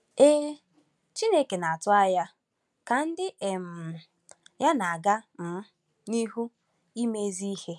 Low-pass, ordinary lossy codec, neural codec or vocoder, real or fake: none; none; none; real